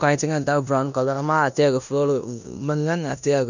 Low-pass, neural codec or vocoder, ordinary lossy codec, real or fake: 7.2 kHz; codec, 16 kHz in and 24 kHz out, 0.9 kbps, LongCat-Audio-Codec, four codebook decoder; none; fake